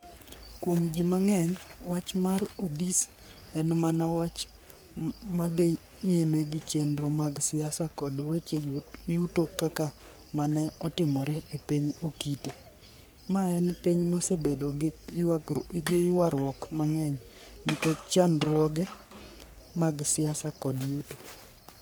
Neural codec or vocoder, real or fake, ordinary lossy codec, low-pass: codec, 44.1 kHz, 3.4 kbps, Pupu-Codec; fake; none; none